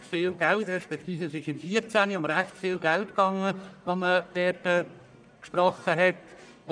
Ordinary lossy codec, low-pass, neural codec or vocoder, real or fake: none; 9.9 kHz; codec, 44.1 kHz, 1.7 kbps, Pupu-Codec; fake